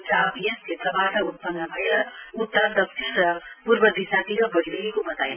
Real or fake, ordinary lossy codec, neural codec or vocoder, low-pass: real; none; none; 3.6 kHz